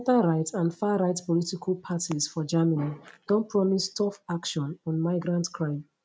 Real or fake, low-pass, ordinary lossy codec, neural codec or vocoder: real; none; none; none